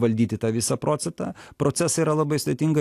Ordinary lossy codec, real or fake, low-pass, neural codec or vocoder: AAC, 64 kbps; real; 14.4 kHz; none